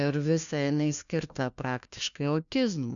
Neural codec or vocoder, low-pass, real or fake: codec, 16 kHz, 1 kbps, FunCodec, trained on LibriTTS, 50 frames a second; 7.2 kHz; fake